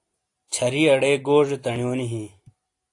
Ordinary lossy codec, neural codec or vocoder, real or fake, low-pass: AAC, 48 kbps; none; real; 10.8 kHz